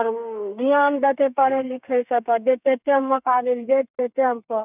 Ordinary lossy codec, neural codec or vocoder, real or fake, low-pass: none; codec, 32 kHz, 1.9 kbps, SNAC; fake; 3.6 kHz